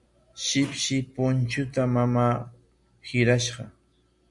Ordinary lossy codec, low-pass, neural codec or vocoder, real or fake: MP3, 96 kbps; 10.8 kHz; none; real